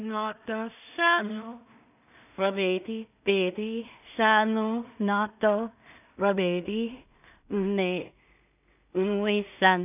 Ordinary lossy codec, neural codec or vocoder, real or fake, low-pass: none; codec, 16 kHz in and 24 kHz out, 0.4 kbps, LongCat-Audio-Codec, two codebook decoder; fake; 3.6 kHz